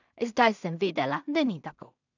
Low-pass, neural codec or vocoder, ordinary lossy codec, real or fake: 7.2 kHz; codec, 16 kHz in and 24 kHz out, 0.4 kbps, LongCat-Audio-Codec, two codebook decoder; none; fake